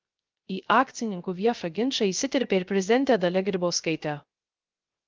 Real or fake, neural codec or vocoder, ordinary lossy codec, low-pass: fake; codec, 16 kHz, 0.3 kbps, FocalCodec; Opus, 24 kbps; 7.2 kHz